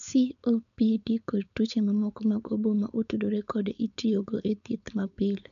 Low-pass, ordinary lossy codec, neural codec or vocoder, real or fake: 7.2 kHz; none; codec, 16 kHz, 4.8 kbps, FACodec; fake